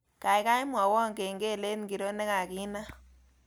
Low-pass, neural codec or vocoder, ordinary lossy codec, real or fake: none; none; none; real